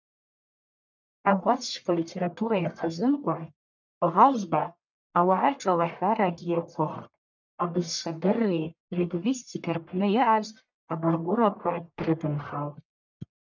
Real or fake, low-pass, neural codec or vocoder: fake; 7.2 kHz; codec, 44.1 kHz, 1.7 kbps, Pupu-Codec